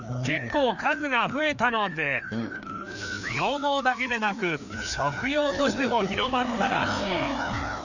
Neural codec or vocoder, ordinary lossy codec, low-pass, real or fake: codec, 16 kHz, 2 kbps, FreqCodec, larger model; none; 7.2 kHz; fake